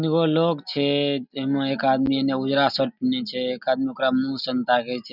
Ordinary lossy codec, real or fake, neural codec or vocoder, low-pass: none; real; none; 5.4 kHz